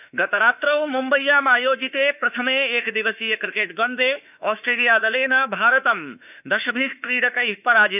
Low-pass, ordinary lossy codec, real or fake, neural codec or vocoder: 3.6 kHz; none; fake; autoencoder, 48 kHz, 32 numbers a frame, DAC-VAE, trained on Japanese speech